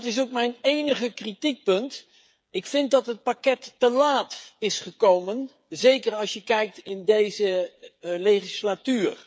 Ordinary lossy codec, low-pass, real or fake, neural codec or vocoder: none; none; fake; codec, 16 kHz, 8 kbps, FreqCodec, smaller model